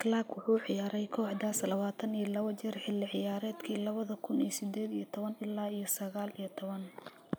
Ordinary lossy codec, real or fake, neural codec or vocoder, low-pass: none; real; none; none